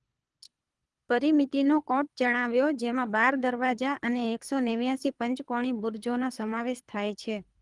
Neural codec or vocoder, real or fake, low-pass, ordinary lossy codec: codec, 24 kHz, 3 kbps, HILCodec; fake; 10.8 kHz; Opus, 24 kbps